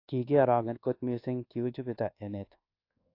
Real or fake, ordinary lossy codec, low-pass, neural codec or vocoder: fake; AAC, 32 kbps; 5.4 kHz; codec, 24 kHz, 1.2 kbps, DualCodec